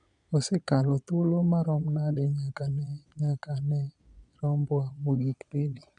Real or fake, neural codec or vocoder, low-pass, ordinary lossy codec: fake; vocoder, 22.05 kHz, 80 mel bands, Vocos; 9.9 kHz; none